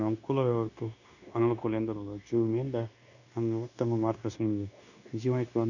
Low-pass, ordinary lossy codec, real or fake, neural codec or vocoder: 7.2 kHz; none; fake; codec, 16 kHz, 0.9 kbps, LongCat-Audio-Codec